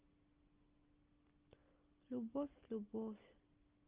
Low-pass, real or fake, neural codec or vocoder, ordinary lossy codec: 3.6 kHz; real; none; Opus, 24 kbps